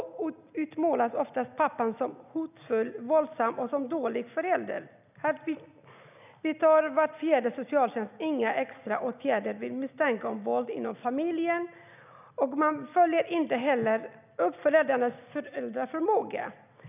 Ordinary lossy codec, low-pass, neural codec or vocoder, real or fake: none; 3.6 kHz; none; real